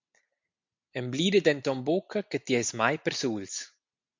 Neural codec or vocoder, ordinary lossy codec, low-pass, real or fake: none; MP3, 64 kbps; 7.2 kHz; real